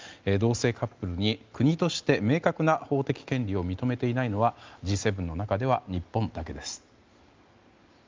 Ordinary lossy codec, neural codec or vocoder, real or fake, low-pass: Opus, 24 kbps; none; real; 7.2 kHz